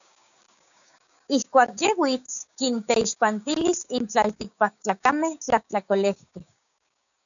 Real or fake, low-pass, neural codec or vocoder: fake; 7.2 kHz; codec, 16 kHz, 6 kbps, DAC